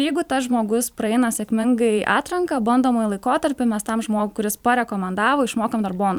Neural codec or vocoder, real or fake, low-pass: vocoder, 44.1 kHz, 128 mel bands every 512 samples, BigVGAN v2; fake; 19.8 kHz